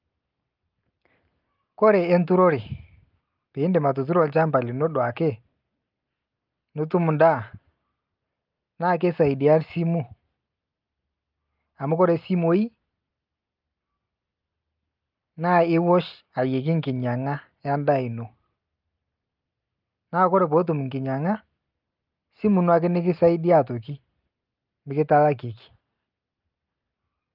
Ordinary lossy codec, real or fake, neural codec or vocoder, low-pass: Opus, 24 kbps; real; none; 5.4 kHz